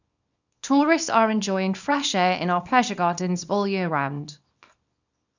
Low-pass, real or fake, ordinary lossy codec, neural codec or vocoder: 7.2 kHz; fake; none; codec, 24 kHz, 0.9 kbps, WavTokenizer, small release